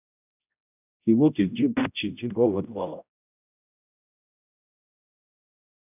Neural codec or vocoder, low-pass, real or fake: codec, 16 kHz, 0.5 kbps, X-Codec, HuBERT features, trained on general audio; 3.6 kHz; fake